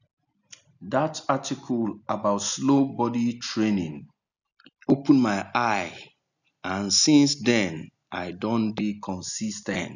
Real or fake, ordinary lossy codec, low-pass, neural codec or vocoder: real; none; 7.2 kHz; none